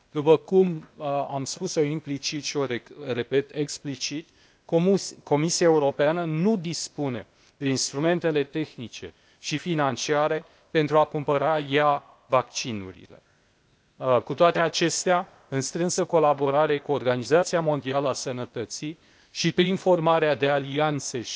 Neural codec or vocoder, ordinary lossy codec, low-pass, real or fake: codec, 16 kHz, 0.8 kbps, ZipCodec; none; none; fake